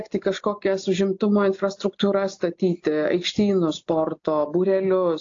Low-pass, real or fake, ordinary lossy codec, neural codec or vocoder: 7.2 kHz; real; AAC, 48 kbps; none